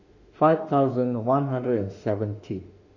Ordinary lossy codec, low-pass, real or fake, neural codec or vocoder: Opus, 64 kbps; 7.2 kHz; fake; autoencoder, 48 kHz, 32 numbers a frame, DAC-VAE, trained on Japanese speech